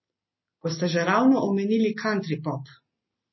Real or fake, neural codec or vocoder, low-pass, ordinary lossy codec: real; none; 7.2 kHz; MP3, 24 kbps